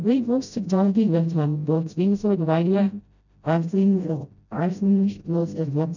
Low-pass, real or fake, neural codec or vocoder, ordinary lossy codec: 7.2 kHz; fake; codec, 16 kHz, 0.5 kbps, FreqCodec, smaller model; none